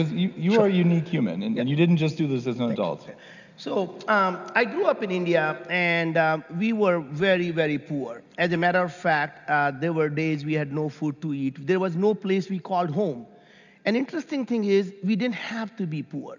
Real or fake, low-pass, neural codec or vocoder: real; 7.2 kHz; none